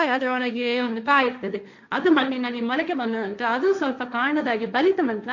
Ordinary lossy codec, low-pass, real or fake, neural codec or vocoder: none; 7.2 kHz; fake; codec, 16 kHz, 1.1 kbps, Voila-Tokenizer